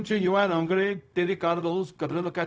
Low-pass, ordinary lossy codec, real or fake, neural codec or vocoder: none; none; fake; codec, 16 kHz, 0.4 kbps, LongCat-Audio-Codec